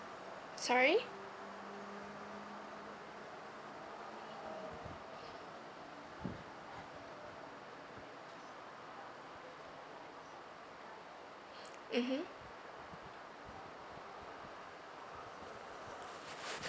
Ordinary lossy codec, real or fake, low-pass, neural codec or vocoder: none; real; none; none